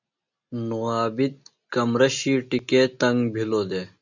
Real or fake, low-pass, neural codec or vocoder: real; 7.2 kHz; none